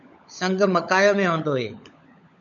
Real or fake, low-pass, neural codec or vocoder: fake; 7.2 kHz; codec, 16 kHz, 16 kbps, FunCodec, trained on LibriTTS, 50 frames a second